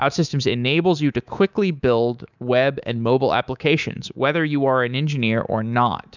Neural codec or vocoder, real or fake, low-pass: codec, 24 kHz, 3.1 kbps, DualCodec; fake; 7.2 kHz